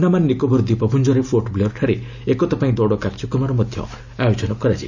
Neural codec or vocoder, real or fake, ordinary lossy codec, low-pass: none; real; none; 7.2 kHz